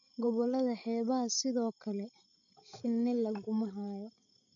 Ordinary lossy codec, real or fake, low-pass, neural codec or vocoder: none; fake; 7.2 kHz; codec, 16 kHz, 16 kbps, FreqCodec, larger model